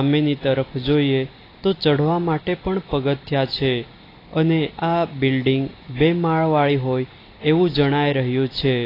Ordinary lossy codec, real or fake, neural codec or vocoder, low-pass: AAC, 24 kbps; real; none; 5.4 kHz